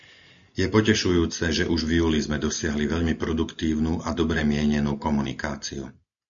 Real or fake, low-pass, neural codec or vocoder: real; 7.2 kHz; none